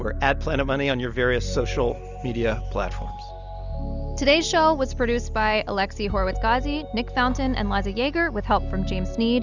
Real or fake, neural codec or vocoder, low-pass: real; none; 7.2 kHz